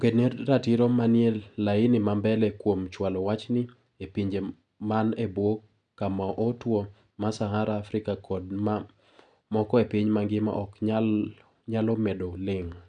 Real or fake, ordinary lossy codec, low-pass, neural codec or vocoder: real; none; 9.9 kHz; none